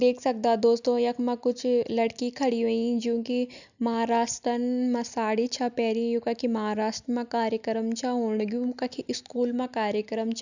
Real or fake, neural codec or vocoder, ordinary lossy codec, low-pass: real; none; none; 7.2 kHz